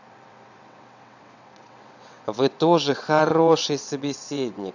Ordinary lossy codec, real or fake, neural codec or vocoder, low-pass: none; fake; vocoder, 22.05 kHz, 80 mel bands, WaveNeXt; 7.2 kHz